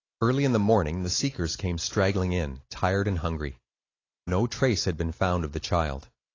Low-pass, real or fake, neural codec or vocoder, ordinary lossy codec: 7.2 kHz; real; none; AAC, 32 kbps